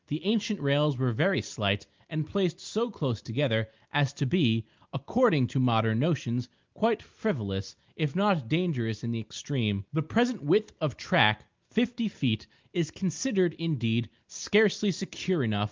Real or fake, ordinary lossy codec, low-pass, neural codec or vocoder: real; Opus, 32 kbps; 7.2 kHz; none